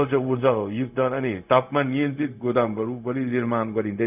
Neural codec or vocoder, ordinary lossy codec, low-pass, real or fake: codec, 16 kHz, 0.4 kbps, LongCat-Audio-Codec; none; 3.6 kHz; fake